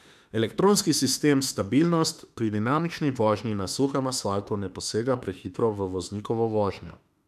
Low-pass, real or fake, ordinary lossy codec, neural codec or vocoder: 14.4 kHz; fake; none; autoencoder, 48 kHz, 32 numbers a frame, DAC-VAE, trained on Japanese speech